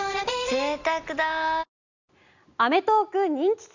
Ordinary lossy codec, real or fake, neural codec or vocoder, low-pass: Opus, 64 kbps; real; none; 7.2 kHz